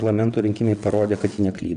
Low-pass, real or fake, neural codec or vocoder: 9.9 kHz; fake; vocoder, 22.05 kHz, 80 mel bands, WaveNeXt